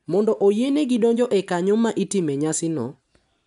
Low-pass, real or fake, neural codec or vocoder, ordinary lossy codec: 10.8 kHz; real; none; none